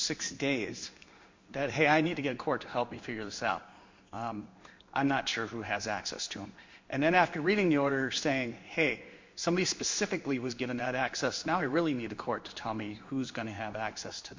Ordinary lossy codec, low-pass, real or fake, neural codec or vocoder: MP3, 64 kbps; 7.2 kHz; fake; codec, 16 kHz in and 24 kHz out, 1 kbps, XY-Tokenizer